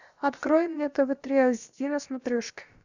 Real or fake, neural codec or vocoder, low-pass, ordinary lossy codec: fake; codec, 16 kHz, about 1 kbps, DyCAST, with the encoder's durations; 7.2 kHz; Opus, 64 kbps